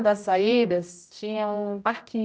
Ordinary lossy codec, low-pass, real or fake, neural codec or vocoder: none; none; fake; codec, 16 kHz, 0.5 kbps, X-Codec, HuBERT features, trained on general audio